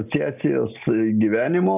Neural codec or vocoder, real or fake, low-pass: none; real; 3.6 kHz